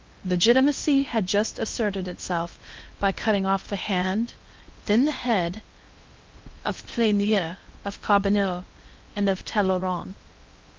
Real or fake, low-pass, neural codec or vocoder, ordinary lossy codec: fake; 7.2 kHz; codec, 16 kHz in and 24 kHz out, 0.8 kbps, FocalCodec, streaming, 65536 codes; Opus, 24 kbps